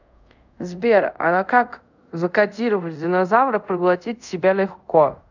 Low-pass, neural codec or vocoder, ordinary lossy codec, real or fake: 7.2 kHz; codec, 24 kHz, 0.5 kbps, DualCodec; none; fake